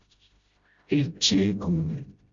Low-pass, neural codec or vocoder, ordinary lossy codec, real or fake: 7.2 kHz; codec, 16 kHz, 0.5 kbps, FreqCodec, smaller model; Opus, 64 kbps; fake